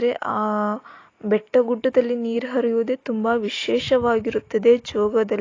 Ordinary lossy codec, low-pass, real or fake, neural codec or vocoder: AAC, 32 kbps; 7.2 kHz; real; none